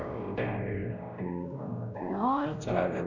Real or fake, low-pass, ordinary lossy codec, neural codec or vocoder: fake; 7.2 kHz; none; codec, 16 kHz, 1 kbps, X-Codec, WavLM features, trained on Multilingual LibriSpeech